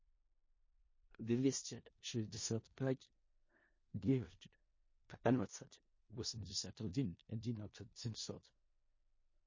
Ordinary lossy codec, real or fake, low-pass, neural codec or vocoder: MP3, 32 kbps; fake; 7.2 kHz; codec, 16 kHz in and 24 kHz out, 0.4 kbps, LongCat-Audio-Codec, four codebook decoder